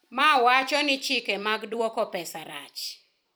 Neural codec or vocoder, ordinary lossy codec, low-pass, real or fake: vocoder, 44.1 kHz, 128 mel bands every 256 samples, BigVGAN v2; none; none; fake